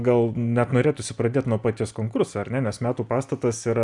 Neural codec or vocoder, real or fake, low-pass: none; real; 10.8 kHz